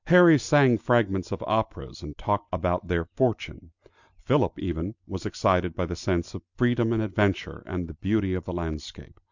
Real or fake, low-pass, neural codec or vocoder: real; 7.2 kHz; none